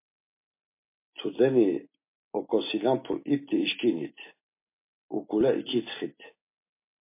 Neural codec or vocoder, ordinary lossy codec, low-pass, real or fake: none; MP3, 24 kbps; 3.6 kHz; real